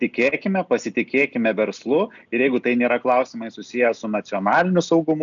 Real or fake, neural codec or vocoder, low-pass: real; none; 7.2 kHz